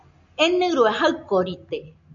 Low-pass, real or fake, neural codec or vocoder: 7.2 kHz; real; none